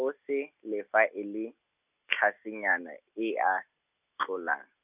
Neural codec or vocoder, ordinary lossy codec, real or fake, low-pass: none; none; real; 3.6 kHz